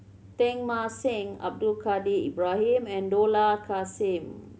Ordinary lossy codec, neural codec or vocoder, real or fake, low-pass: none; none; real; none